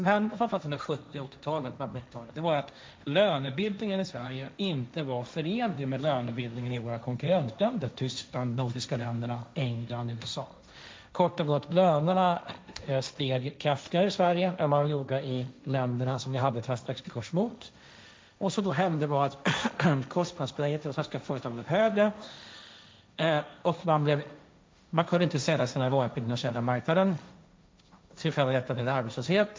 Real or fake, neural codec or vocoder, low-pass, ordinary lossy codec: fake; codec, 16 kHz, 1.1 kbps, Voila-Tokenizer; none; none